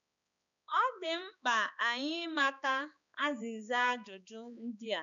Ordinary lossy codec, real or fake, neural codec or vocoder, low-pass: none; fake; codec, 16 kHz, 2 kbps, X-Codec, HuBERT features, trained on balanced general audio; 7.2 kHz